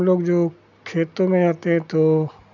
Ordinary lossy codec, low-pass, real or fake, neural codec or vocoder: none; 7.2 kHz; real; none